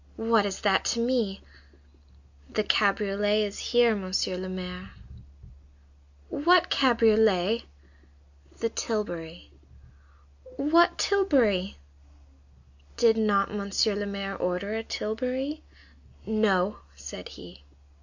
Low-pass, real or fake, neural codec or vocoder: 7.2 kHz; real; none